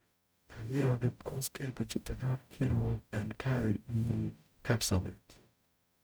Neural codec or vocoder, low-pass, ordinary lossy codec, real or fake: codec, 44.1 kHz, 0.9 kbps, DAC; none; none; fake